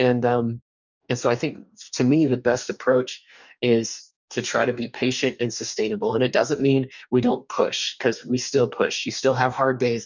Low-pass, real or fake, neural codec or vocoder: 7.2 kHz; fake; codec, 44.1 kHz, 2.6 kbps, DAC